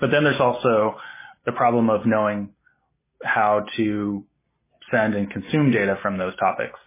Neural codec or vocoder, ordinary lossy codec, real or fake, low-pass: none; MP3, 16 kbps; real; 3.6 kHz